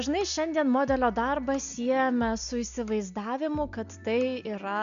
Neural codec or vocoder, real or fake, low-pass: none; real; 7.2 kHz